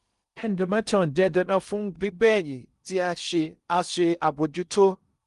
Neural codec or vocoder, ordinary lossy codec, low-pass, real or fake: codec, 16 kHz in and 24 kHz out, 0.6 kbps, FocalCodec, streaming, 2048 codes; Opus, 24 kbps; 10.8 kHz; fake